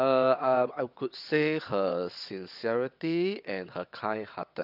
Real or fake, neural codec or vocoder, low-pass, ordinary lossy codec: fake; vocoder, 22.05 kHz, 80 mel bands, WaveNeXt; 5.4 kHz; none